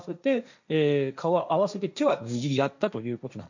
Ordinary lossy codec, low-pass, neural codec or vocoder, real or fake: none; 7.2 kHz; codec, 16 kHz, 1.1 kbps, Voila-Tokenizer; fake